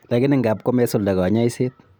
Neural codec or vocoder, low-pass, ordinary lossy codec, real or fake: none; none; none; real